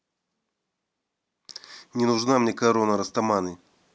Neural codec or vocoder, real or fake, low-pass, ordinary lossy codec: none; real; none; none